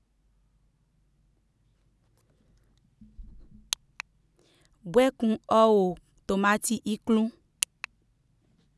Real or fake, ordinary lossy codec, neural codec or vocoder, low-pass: real; none; none; none